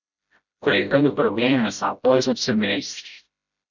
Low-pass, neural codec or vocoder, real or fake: 7.2 kHz; codec, 16 kHz, 0.5 kbps, FreqCodec, smaller model; fake